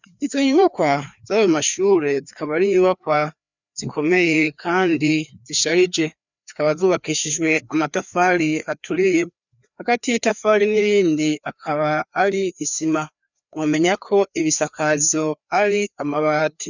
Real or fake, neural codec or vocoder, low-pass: fake; codec, 16 kHz, 2 kbps, FreqCodec, larger model; 7.2 kHz